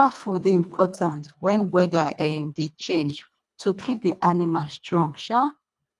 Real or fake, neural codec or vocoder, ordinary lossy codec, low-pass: fake; codec, 24 kHz, 1.5 kbps, HILCodec; none; none